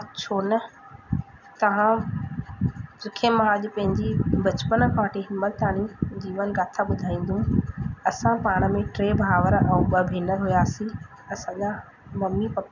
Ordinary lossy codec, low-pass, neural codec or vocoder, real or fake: none; 7.2 kHz; none; real